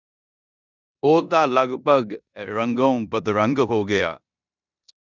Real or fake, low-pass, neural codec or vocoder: fake; 7.2 kHz; codec, 16 kHz in and 24 kHz out, 0.9 kbps, LongCat-Audio-Codec, four codebook decoder